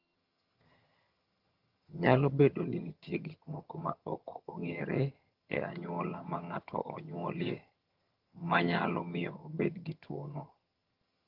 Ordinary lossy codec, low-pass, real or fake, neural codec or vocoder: Opus, 32 kbps; 5.4 kHz; fake; vocoder, 22.05 kHz, 80 mel bands, HiFi-GAN